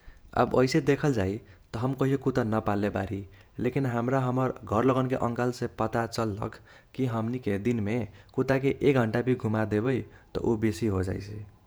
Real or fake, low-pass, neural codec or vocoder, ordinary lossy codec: fake; none; vocoder, 48 kHz, 128 mel bands, Vocos; none